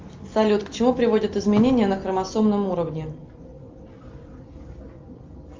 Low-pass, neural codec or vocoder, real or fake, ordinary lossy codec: 7.2 kHz; none; real; Opus, 32 kbps